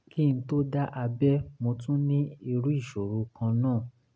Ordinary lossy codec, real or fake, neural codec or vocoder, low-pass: none; real; none; none